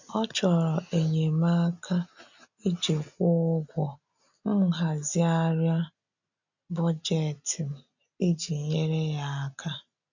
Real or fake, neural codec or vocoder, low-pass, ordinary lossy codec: real; none; 7.2 kHz; none